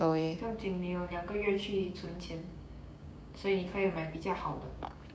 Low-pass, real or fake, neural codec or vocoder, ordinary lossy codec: none; fake; codec, 16 kHz, 6 kbps, DAC; none